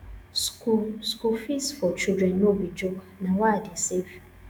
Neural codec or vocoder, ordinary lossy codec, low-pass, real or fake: autoencoder, 48 kHz, 128 numbers a frame, DAC-VAE, trained on Japanese speech; none; none; fake